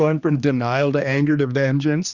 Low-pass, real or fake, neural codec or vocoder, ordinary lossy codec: 7.2 kHz; fake; codec, 16 kHz, 2 kbps, X-Codec, HuBERT features, trained on general audio; Opus, 64 kbps